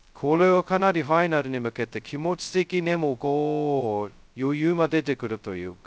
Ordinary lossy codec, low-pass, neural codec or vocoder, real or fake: none; none; codec, 16 kHz, 0.2 kbps, FocalCodec; fake